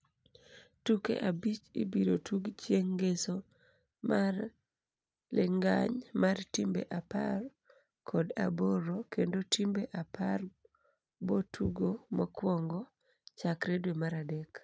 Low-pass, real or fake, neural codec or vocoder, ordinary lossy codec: none; real; none; none